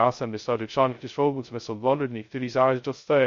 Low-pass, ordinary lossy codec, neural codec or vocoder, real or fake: 7.2 kHz; MP3, 48 kbps; codec, 16 kHz, 0.2 kbps, FocalCodec; fake